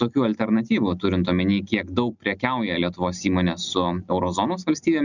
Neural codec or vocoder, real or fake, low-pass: none; real; 7.2 kHz